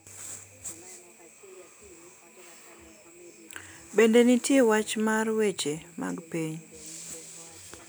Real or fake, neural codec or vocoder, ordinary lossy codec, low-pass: real; none; none; none